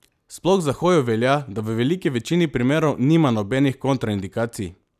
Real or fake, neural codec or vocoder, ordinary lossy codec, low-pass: real; none; none; 14.4 kHz